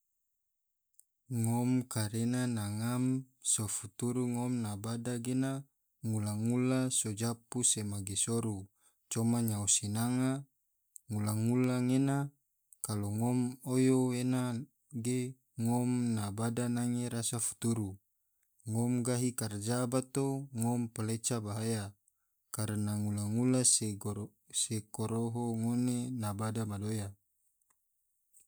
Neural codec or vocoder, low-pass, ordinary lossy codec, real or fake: none; none; none; real